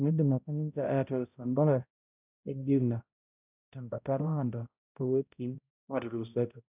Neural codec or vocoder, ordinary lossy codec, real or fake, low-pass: codec, 16 kHz, 0.5 kbps, X-Codec, HuBERT features, trained on balanced general audio; none; fake; 3.6 kHz